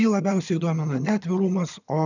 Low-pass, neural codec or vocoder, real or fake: 7.2 kHz; vocoder, 22.05 kHz, 80 mel bands, HiFi-GAN; fake